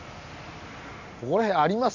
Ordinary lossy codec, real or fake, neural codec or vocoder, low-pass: none; real; none; 7.2 kHz